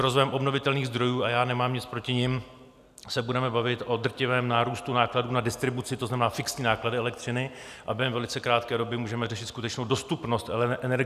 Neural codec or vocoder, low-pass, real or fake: none; 14.4 kHz; real